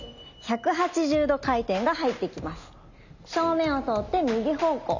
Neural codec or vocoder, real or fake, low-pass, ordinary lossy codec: none; real; 7.2 kHz; none